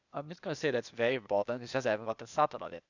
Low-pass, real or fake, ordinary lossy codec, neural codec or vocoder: 7.2 kHz; fake; none; codec, 16 kHz, 0.8 kbps, ZipCodec